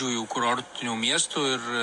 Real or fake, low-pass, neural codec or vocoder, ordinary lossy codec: real; 10.8 kHz; none; MP3, 48 kbps